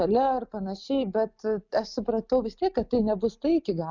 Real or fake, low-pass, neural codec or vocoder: real; 7.2 kHz; none